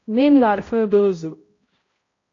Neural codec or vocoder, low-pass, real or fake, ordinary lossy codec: codec, 16 kHz, 0.5 kbps, X-Codec, HuBERT features, trained on balanced general audio; 7.2 kHz; fake; AAC, 32 kbps